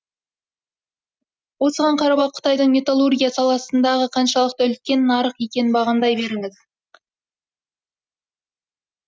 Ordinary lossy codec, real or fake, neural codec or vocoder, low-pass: none; real; none; none